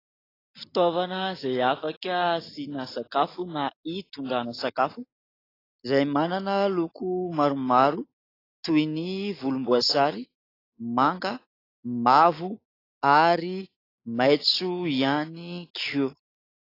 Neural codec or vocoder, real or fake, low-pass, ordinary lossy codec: none; real; 5.4 kHz; AAC, 24 kbps